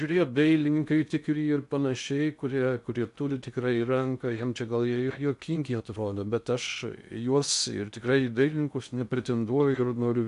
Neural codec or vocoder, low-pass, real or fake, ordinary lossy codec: codec, 16 kHz in and 24 kHz out, 0.6 kbps, FocalCodec, streaming, 2048 codes; 10.8 kHz; fake; AAC, 96 kbps